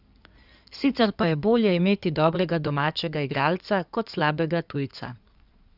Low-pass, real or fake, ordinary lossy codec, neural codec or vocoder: 5.4 kHz; fake; none; codec, 16 kHz in and 24 kHz out, 2.2 kbps, FireRedTTS-2 codec